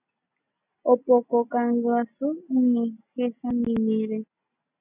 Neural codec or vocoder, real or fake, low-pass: none; real; 3.6 kHz